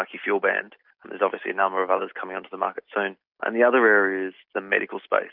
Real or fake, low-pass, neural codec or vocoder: real; 5.4 kHz; none